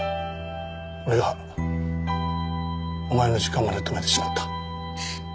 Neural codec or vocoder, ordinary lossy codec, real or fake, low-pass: none; none; real; none